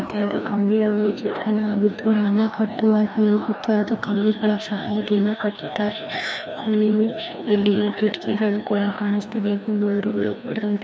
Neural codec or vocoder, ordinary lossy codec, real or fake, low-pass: codec, 16 kHz, 1 kbps, FreqCodec, larger model; none; fake; none